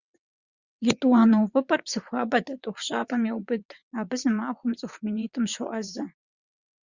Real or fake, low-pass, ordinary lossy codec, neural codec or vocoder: fake; 7.2 kHz; Opus, 64 kbps; vocoder, 22.05 kHz, 80 mel bands, WaveNeXt